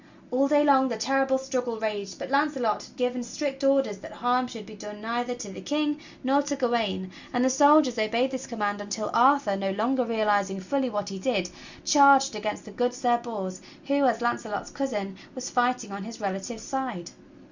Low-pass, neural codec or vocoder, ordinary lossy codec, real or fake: 7.2 kHz; none; Opus, 64 kbps; real